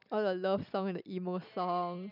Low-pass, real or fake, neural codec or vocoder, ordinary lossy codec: 5.4 kHz; real; none; none